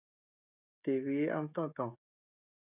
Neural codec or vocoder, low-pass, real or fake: codec, 16 kHz, 16 kbps, FreqCodec, smaller model; 3.6 kHz; fake